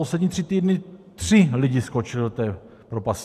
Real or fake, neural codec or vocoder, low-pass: fake; vocoder, 44.1 kHz, 128 mel bands every 512 samples, BigVGAN v2; 14.4 kHz